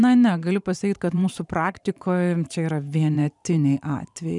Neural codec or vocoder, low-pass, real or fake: vocoder, 44.1 kHz, 128 mel bands every 512 samples, BigVGAN v2; 10.8 kHz; fake